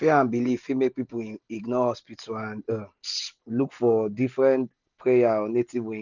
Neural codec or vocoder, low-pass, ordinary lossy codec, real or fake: none; 7.2 kHz; none; real